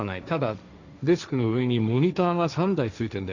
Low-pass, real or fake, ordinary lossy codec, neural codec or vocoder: 7.2 kHz; fake; none; codec, 16 kHz, 1.1 kbps, Voila-Tokenizer